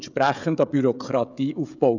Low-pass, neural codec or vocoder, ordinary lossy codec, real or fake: 7.2 kHz; codec, 16 kHz, 16 kbps, FreqCodec, smaller model; none; fake